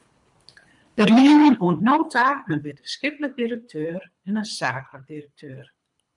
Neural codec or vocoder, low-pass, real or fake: codec, 24 kHz, 3 kbps, HILCodec; 10.8 kHz; fake